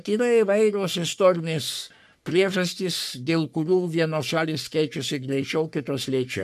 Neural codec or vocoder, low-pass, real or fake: codec, 44.1 kHz, 3.4 kbps, Pupu-Codec; 14.4 kHz; fake